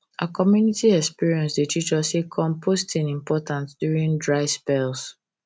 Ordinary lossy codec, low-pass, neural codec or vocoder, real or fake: none; none; none; real